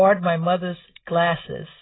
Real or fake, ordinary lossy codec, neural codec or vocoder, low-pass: real; AAC, 16 kbps; none; 7.2 kHz